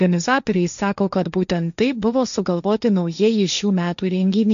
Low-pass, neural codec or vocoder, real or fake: 7.2 kHz; codec, 16 kHz, 1.1 kbps, Voila-Tokenizer; fake